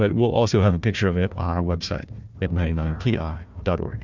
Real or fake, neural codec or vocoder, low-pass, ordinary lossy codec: fake; codec, 16 kHz, 1 kbps, FunCodec, trained on Chinese and English, 50 frames a second; 7.2 kHz; Opus, 64 kbps